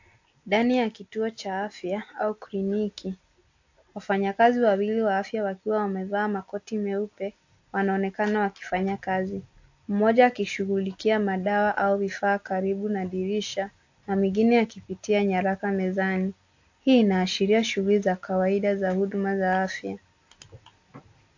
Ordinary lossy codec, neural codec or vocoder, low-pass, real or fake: AAC, 48 kbps; none; 7.2 kHz; real